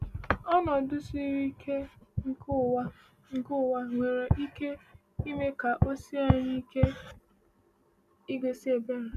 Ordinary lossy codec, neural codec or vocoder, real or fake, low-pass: none; none; real; 14.4 kHz